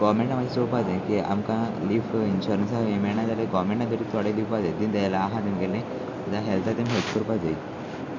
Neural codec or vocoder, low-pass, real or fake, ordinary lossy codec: none; 7.2 kHz; real; MP3, 48 kbps